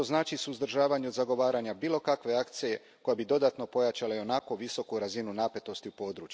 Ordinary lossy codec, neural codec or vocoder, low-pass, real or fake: none; none; none; real